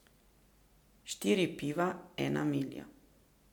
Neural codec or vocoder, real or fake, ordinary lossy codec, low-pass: none; real; MP3, 96 kbps; 19.8 kHz